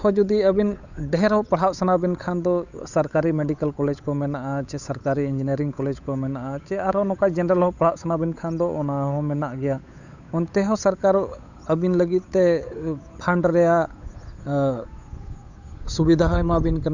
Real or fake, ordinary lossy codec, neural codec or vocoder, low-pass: fake; none; codec, 16 kHz, 8 kbps, FunCodec, trained on Chinese and English, 25 frames a second; 7.2 kHz